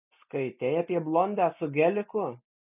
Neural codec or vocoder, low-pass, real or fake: none; 3.6 kHz; real